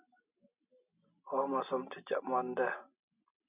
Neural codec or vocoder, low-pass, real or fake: none; 3.6 kHz; real